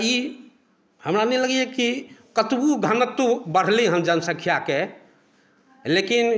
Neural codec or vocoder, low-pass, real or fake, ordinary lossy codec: none; none; real; none